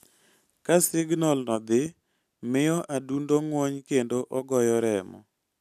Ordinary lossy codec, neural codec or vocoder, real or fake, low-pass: none; none; real; 14.4 kHz